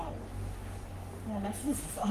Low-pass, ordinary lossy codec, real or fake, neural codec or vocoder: 14.4 kHz; Opus, 24 kbps; fake; codec, 44.1 kHz, 3.4 kbps, Pupu-Codec